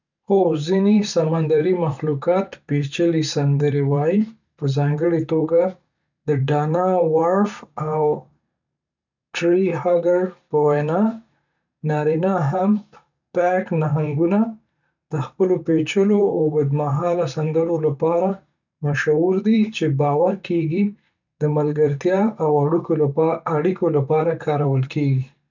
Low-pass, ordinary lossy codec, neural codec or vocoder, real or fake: 7.2 kHz; none; vocoder, 44.1 kHz, 128 mel bands every 512 samples, BigVGAN v2; fake